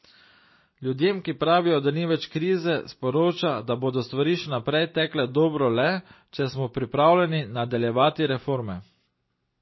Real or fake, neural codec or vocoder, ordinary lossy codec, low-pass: real; none; MP3, 24 kbps; 7.2 kHz